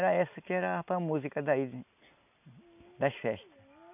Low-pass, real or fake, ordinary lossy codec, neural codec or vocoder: 3.6 kHz; real; none; none